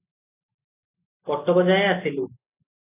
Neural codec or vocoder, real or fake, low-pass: none; real; 3.6 kHz